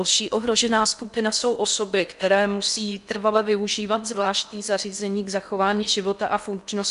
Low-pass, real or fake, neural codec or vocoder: 10.8 kHz; fake; codec, 16 kHz in and 24 kHz out, 0.6 kbps, FocalCodec, streaming, 4096 codes